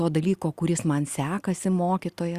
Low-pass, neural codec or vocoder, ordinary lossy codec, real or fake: 14.4 kHz; none; Opus, 64 kbps; real